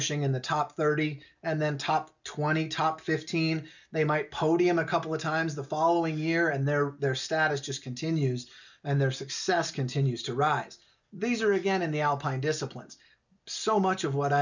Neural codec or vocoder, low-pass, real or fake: none; 7.2 kHz; real